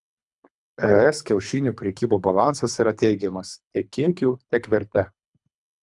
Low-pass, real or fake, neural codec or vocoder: 10.8 kHz; fake; codec, 24 kHz, 3 kbps, HILCodec